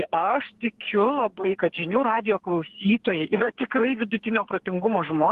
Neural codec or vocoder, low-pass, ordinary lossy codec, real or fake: codec, 44.1 kHz, 2.6 kbps, SNAC; 14.4 kHz; Opus, 16 kbps; fake